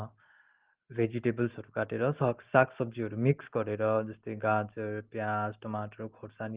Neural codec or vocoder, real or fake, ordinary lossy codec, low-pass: none; real; Opus, 16 kbps; 3.6 kHz